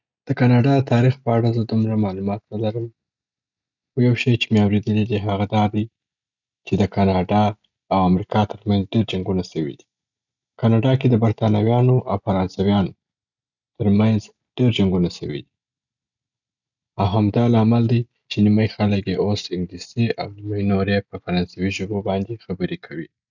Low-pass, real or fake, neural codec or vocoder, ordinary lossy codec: 7.2 kHz; real; none; none